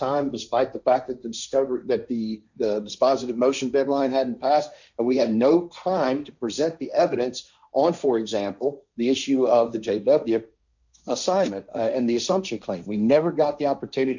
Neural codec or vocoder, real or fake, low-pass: codec, 16 kHz, 1.1 kbps, Voila-Tokenizer; fake; 7.2 kHz